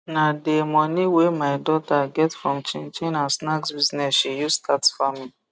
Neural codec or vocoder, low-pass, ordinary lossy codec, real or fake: none; none; none; real